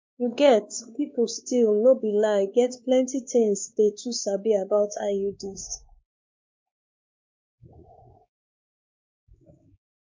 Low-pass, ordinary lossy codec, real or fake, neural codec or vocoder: 7.2 kHz; MP3, 64 kbps; fake; codec, 16 kHz, 2 kbps, X-Codec, WavLM features, trained on Multilingual LibriSpeech